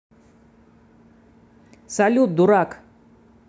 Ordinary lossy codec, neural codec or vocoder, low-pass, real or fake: none; none; none; real